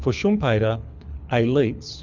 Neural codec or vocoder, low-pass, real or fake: codec, 24 kHz, 6 kbps, HILCodec; 7.2 kHz; fake